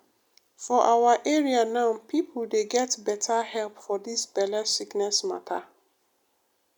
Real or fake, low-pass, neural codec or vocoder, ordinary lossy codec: real; none; none; none